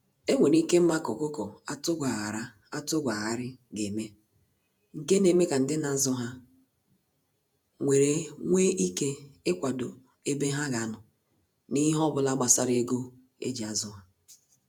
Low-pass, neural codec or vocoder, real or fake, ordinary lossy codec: none; vocoder, 48 kHz, 128 mel bands, Vocos; fake; none